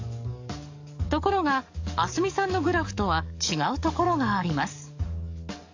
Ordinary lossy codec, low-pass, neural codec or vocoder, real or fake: AAC, 48 kbps; 7.2 kHz; codec, 44.1 kHz, 7.8 kbps, DAC; fake